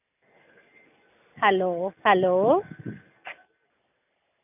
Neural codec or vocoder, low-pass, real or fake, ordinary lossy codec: none; 3.6 kHz; real; none